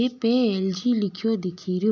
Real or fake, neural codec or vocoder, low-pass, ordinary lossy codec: real; none; 7.2 kHz; none